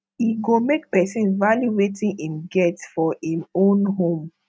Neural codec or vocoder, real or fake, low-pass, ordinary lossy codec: none; real; none; none